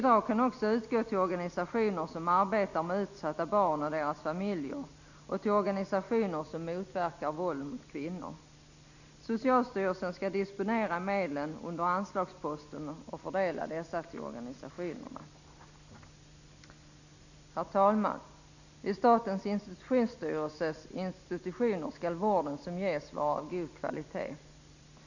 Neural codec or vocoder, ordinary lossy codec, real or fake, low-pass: none; none; real; 7.2 kHz